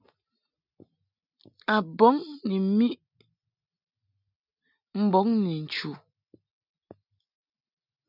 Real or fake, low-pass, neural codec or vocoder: real; 5.4 kHz; none